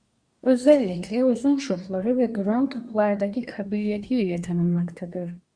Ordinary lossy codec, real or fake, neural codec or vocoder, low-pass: Opus, 64 kbps; fake; codec, 24 kHz, 1 kbps, SNAC; 9.9 kHz